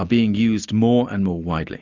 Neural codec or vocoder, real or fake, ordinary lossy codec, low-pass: none; real; Opus, 64 kbps; 7.2 kHz